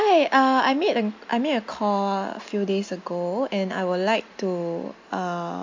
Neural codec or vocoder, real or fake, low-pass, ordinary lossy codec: none; real; 7.2 kHz; MP3, 48 kbps